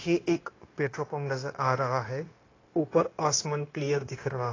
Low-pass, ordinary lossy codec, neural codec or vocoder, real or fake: 7.2 kHz; AAC, 32 kbps; codec, 16 kHz, 0.9 kbps, LongCat-Audio-Codec; fake